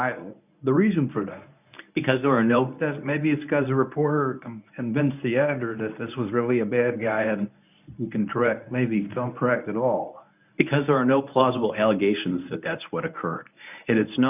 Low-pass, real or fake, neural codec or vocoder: 3.6 kHz; fake; codec, 24 kHz, 0.9 kbps, WavTokenizer, medium speech release version 1